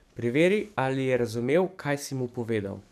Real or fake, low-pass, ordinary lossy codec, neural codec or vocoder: fake; 14.4 kHz; none; codec, 44.1 kHz, 7.8 kbps, DAC